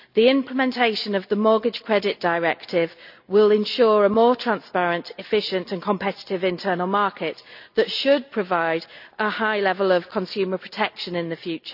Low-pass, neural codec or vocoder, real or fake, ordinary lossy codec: 5.4 kHz; none; real; none